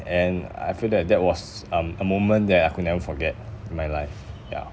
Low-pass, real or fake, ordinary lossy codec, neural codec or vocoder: none; real; none; none